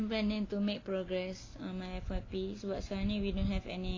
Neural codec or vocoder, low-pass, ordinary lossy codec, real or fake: none; 7.2 kHz; AAC, 32 kbps; real